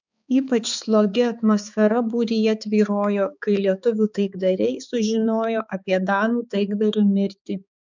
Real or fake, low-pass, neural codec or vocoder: fake; 7.2 kHz; codec, 16 kHz, 4 kbps, X-Codec, HuBERT features, trained on balanced general audio